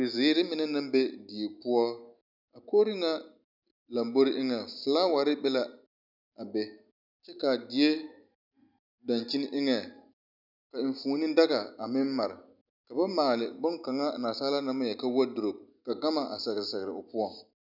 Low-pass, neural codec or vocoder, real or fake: 5.4 kHz; none; real